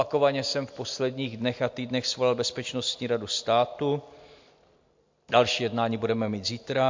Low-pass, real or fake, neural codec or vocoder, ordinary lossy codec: 7.2 kHz; real; none; MP3, 48 kbps